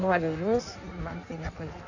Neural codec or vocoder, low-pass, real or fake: codec, 16 kHz in and 24 kHz out, 1.1 kbps, FireRedTTS-2 codec; 7.2 kHz; fake